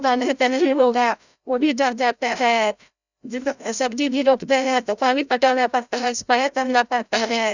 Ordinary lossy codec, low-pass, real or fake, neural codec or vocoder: none; 7.2 kHz; fake; codec, 16 kHz, 0.5 kbps, FreqCodec, larger model